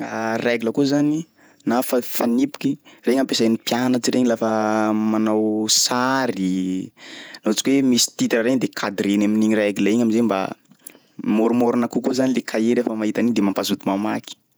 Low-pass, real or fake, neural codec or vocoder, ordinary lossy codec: none; fake; vocoder, 48 kHz, 128 mel bands, Vocos; none